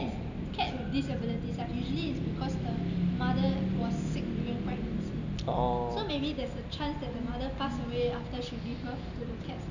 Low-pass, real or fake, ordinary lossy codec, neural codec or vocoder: 7.2 kHz; real; none; none